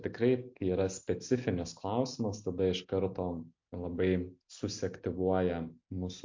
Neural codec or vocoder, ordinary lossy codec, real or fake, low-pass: none; MP3, 48 kbps; real; 7.2 kHz